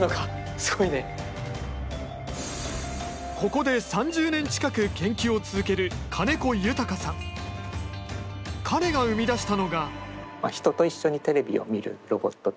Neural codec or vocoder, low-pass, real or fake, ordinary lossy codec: none; none; real; none